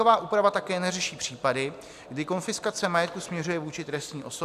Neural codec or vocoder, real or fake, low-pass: none; real; 14.4 kHz